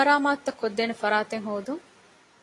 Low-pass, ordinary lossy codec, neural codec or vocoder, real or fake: 10.8 kHz; AAC, 32 kbps; none; real